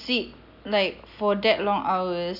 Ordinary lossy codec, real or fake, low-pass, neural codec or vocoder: none; real; 5.4 kHz; none